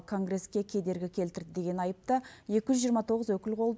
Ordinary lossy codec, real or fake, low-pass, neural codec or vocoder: none; real; none; none